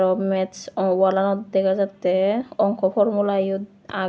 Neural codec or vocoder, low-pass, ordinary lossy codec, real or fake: none; none; none; real